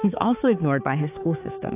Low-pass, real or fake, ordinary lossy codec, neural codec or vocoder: 3.6 kHz; fake; AAC, 16 kbps; codec, 16 kHz, 4 kbps, X-Codec, HuBERT features, trained on balanced general audio